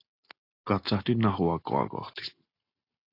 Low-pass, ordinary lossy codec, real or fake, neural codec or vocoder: 5.4 kHz; AAC, 32 kbps; real; none